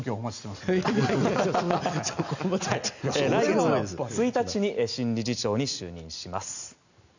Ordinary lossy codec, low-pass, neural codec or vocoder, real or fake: none; 7.2 kHz; none; real